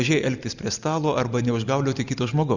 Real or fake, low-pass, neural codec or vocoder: real; 7.2 kHz; none